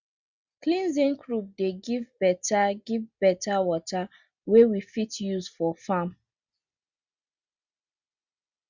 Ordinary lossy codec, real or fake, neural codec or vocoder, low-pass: Opus, 64 kbps; real; none; 7.2 kHz